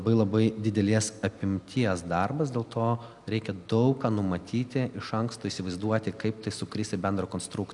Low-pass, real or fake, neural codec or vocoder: 10.8 kHz; real; none